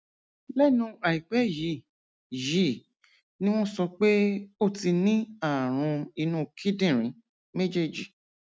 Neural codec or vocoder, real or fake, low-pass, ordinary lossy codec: none; real; none; none